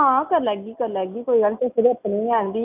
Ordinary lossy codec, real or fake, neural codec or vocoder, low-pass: none; real; none; 3.6 kHz